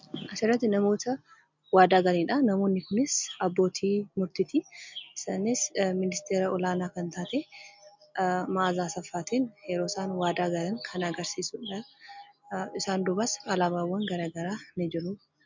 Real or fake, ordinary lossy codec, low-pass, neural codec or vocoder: real; MP3, 64 kbps; 7.2 kHz; none